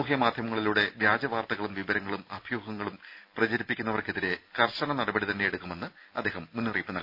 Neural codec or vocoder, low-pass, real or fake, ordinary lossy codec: none; 5.4 kHz; real; none